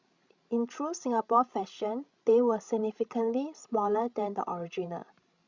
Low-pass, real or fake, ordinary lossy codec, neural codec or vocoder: 7.2 kHz; fake; Opus, 64 kbps; codec, 16 kHz, 16 kbps, FreqCodec, larger model